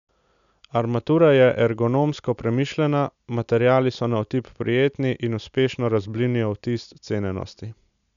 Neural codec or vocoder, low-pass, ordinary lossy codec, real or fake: none; 7.2 kHz; none; real